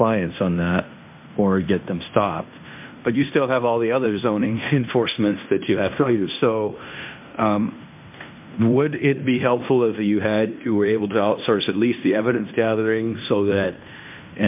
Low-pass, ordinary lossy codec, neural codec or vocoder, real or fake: 3.6 kHz; MP3, 32 kbps; codec, 16 kHz in and 24 kHz out, 0.9 kbps, LongCat-Audio-Codec, fine tuned four codebook decoder; fake